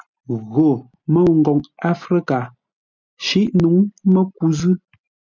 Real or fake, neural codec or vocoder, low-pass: real; none; 7.2 kHz